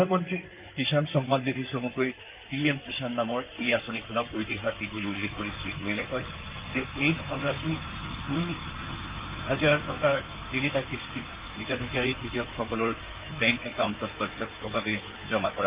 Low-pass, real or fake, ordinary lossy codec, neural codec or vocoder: 3.6 kHz; fake; Opus, 32 kbps; codec, 16 kHz in and 24 kHz out, 2.2 kbps, FireRedTTS-2 codec